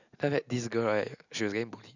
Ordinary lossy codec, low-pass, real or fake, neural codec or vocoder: none; 7.2 kHz; real; none